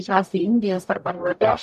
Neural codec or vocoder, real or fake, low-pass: codec, 44.1 kHz, 0.9 kbps, DAC; fake; 14.4 kHz